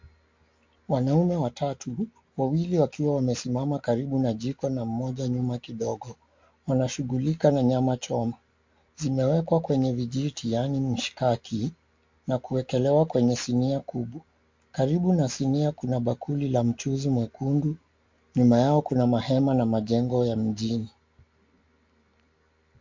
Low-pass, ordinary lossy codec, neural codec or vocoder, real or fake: 7.2 kHz; MP3, 48 kbps; none; real